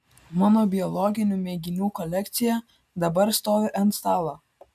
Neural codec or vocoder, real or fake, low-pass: none; real; 14.4 kHz